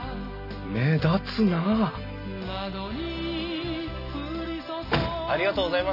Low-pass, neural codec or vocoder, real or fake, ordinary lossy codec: 5.4 kHz; none; real; none